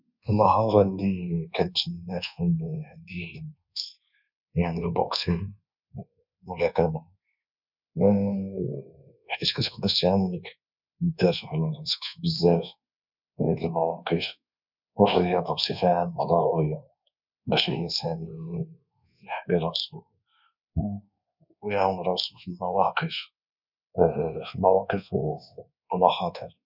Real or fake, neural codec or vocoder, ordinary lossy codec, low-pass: fake; codec, 24 kHz, 1.2 kbps, DualCodec; none; 5.4 kHz